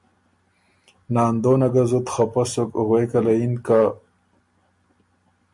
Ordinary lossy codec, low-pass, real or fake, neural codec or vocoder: MP3, 48 kbps; 10.8 kHz; real; none